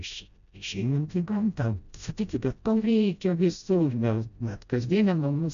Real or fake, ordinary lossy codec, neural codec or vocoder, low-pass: fake; AAC, 96 kbps; codec, 16 kHz, 0.5 kbps, FreqCodec, smaller model; 7.2 kHz